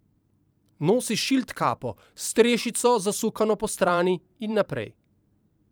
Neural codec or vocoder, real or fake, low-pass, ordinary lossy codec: vocoder, 44.1 kHz, 128 mel bands every 512 samples, BigVGAN v2; fake; none; none